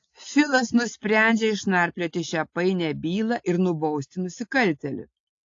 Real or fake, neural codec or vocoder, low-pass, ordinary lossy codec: real; none; 7.2 kHz; AAC, 48 kbps